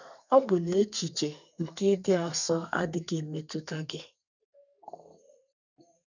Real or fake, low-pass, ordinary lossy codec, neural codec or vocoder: fake; 7.2 kHz; none; codec, 32 kHz, 1.9 kbps, SNAC